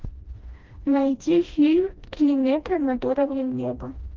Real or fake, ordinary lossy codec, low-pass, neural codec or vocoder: fake; Opus, 32 kbps; 7.2 kHz; codec, 16 kHz, 1 kbps, FreqCodec, smaller model